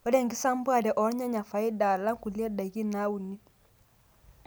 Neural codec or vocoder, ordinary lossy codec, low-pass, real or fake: none; none; none; real